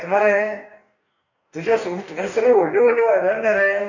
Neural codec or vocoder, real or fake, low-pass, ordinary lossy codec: codec, 44.1 kHz, 2.6 kbps, DAC; fake; 7.2 kHz; none